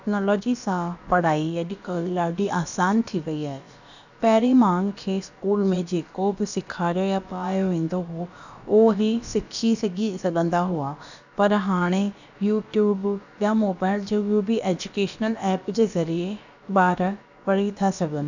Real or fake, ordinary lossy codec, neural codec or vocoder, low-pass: fake; none; codec, 16 kHz, about 1 kbps, DyCAST, with the encoder's durations; 7.2 kHz